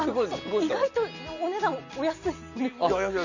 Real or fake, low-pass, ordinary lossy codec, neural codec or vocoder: real; 7.2 kHz; none; none